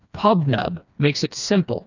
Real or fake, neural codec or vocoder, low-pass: fake; codec, 16 kHz, 2 kbps, FreqCodec, smaller model; 7.2 kHz